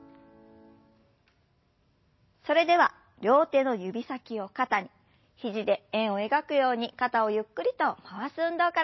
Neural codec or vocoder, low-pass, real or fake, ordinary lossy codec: none; 7.2 kHz; real; MP3, 24 kbps